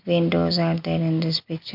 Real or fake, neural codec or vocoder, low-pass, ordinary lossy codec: real; none; 5.4 kHz; MP3, 48 kbps